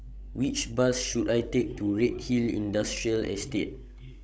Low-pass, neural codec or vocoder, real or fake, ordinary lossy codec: none; codec, 16 kHz, 16 kbps, FreqCodec, larger model; fake; none